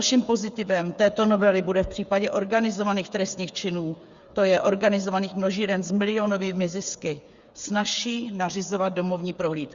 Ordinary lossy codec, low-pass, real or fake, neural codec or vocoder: Opus, 64 kbps; 7.2 kHz; fake; codec, 16 kHz, 8 kbps, FreqCodec, smaller model